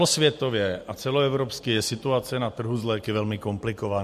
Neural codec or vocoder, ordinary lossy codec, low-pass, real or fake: none; MP3, 64 kbps; 14.4 kHz; real